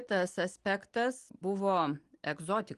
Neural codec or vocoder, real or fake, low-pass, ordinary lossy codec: none; real; 10.8 kHz; Opus, 24 kbps